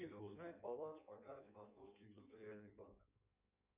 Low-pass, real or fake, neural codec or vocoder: 3.6 kHz; fake; codec, 16 kHz in and 24 kHz out, 1.1 kbps, FireRedTTS-2 codec